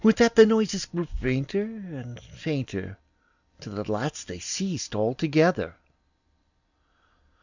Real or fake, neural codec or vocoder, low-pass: real; none; 7.2 kHz